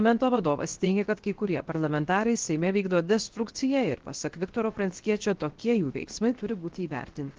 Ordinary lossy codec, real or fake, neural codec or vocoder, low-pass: Opus, 16 kbps; fake; codec, 16 kHz, 0.7 kbps, FocalCodec; 7.2 kHz